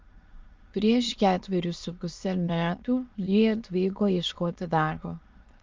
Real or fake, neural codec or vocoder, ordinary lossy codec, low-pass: fake; autoencoder, 22.05 kHz, a latent of 192 numbers a frame, VITS, trained on many speakers; Opus, 32 kbps; 7.2 kHz